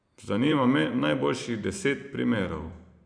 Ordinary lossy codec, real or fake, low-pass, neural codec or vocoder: none; fake; 9.9 kHz; vocoder, 44.1 kHz, 128 mel bands every 512 samples, BigVGAN v2